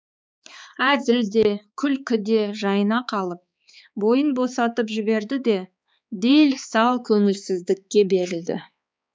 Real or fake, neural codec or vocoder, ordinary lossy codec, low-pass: fake; codec, 16 kHz, 4 kbps, X-Codec, HuBERT features, trained on balanced general audio; none; none